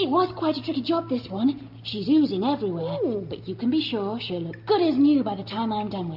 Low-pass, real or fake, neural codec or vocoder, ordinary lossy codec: 5.4 kHz; real; none; AAC, 48 kbps